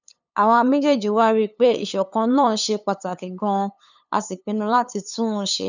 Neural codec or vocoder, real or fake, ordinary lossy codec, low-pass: codec, 16 kHz, 8 kbps, FunCodec, trained on LibriTTS, 25 frames a second; fake; none; 7.2 kHz